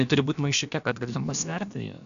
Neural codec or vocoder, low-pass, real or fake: codec, 16 kHz, about 1 kbps, DyCAST, with the encoder's durations; 7.2 kHz; fake